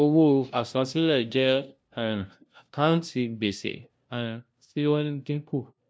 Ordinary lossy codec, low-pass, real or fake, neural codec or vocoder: none; none; fake; codec, 16 kHz, 0.5 kbps, FunCodec, trained on LibriTTS, 25 frames a second